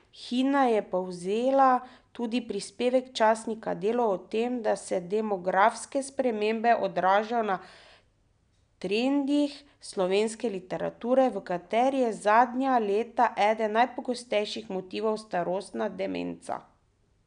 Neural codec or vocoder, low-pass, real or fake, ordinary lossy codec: none; 9.9 kHz; real; none